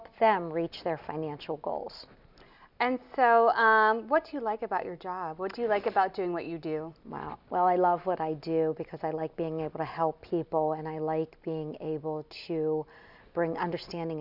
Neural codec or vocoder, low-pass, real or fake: none; 5.4 kHz; real